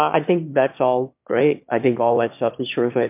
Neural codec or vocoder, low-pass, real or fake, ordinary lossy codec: autoencoder, 22.05 kHz, a latent of 192 numbers a frame, VITS, trained on one speaker; 3.6 kHz; fake; MP3, 32 kbps